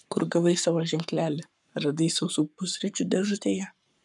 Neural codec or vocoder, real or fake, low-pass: codec, 44.1 kHz, 7.8 kbps, Pupu-Codec; fake; 10.8 kHz